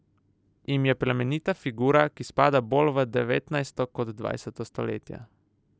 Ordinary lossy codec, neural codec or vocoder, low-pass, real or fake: none; none; none; real